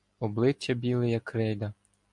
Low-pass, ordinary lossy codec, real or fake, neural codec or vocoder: 10.8 kHz; MP3, 64 kbps; real; none